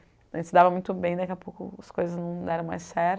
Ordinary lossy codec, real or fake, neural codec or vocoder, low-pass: none; real; none; none